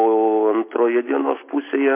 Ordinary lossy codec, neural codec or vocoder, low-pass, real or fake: MP3, 24 kbps; none; 3.6 kHz; real